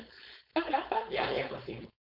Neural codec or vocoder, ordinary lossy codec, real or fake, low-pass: codec, 16 kHz, 4.8 kbps, FACodec; none; fake; 5.4 kHz